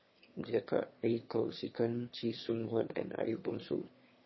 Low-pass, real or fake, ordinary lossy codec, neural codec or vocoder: 7.2 kHz; fake; MP3, 24 kbps; autoencoder, 22.05 kHz, a latent of 192 numbers a frame, VITS, trained on one speaker